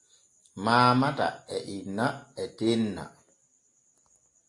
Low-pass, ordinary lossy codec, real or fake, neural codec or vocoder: 10.8 kHz; AAC, 48 kbps; real; none